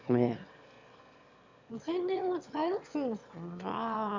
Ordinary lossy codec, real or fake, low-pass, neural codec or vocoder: MP3, 64 kbps; fake; 7.2 kHz; autoencoder, 22.05 kHz, a latent of 192 numbers a frame, VITS, trained on one speaker